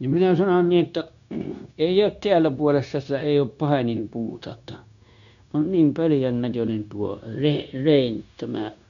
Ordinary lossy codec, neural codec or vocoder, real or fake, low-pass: none; codec, 16 kHz, 0.9 kbps, LongCat-Audio-Codec; fake; 7.2 kHz